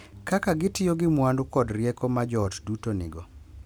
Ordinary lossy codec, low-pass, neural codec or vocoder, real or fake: none; none; none; real